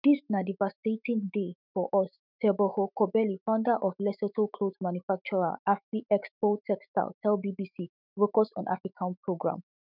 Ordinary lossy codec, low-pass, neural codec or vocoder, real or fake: none; 5.4 kHz; autoencoder, 48 kHz, 128 numbers a frame, DAC-VAE, trained on Japanese speech; fake